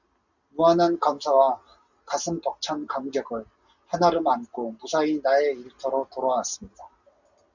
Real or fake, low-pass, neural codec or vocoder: real; 7.2 kHz; none